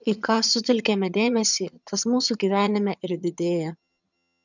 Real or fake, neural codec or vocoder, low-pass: fake; vocoder, 22.05 kHz, 80 mel bands, HiFi-GAN; 7.2 kHz